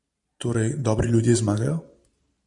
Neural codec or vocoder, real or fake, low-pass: vocoder, 44.1 kHz, 128 mel bands every 512 samples, BigVGAN v2; fake; 10.8 kHz